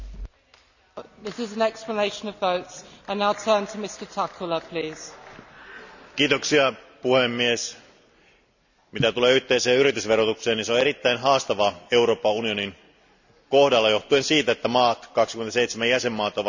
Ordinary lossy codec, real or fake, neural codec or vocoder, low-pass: none; real; none; 7.2 kHz